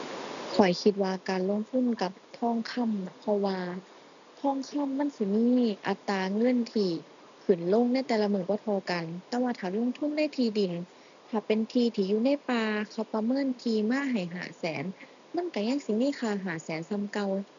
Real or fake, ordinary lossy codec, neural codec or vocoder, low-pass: real; none; none; 7.2 kHz